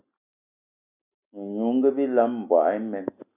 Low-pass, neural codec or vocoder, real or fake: 3.6 kHz; none; real